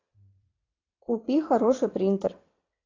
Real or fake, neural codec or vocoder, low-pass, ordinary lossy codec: fake; vocoder, 22.05 kHz, 80 mel bands, WaveNeXt; 7.2 kHz; AAC, 32 kbps